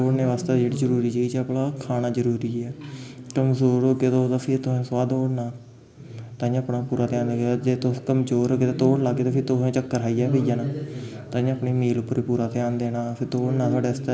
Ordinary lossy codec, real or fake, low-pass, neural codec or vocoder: none; real; none; none